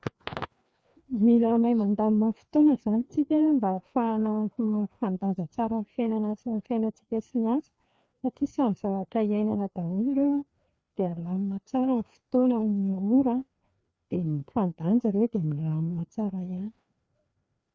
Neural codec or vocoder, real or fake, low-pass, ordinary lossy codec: codec, 16 kHz, 2 kbps, FreqCodec, larger model; fake; none; none